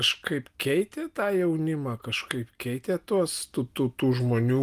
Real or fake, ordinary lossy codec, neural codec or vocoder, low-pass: real; Opus, 32 kbps; none; 14.4 kHz